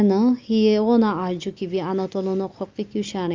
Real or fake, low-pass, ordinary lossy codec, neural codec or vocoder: real; 7.2 kHz; Opus, 32 kbps; none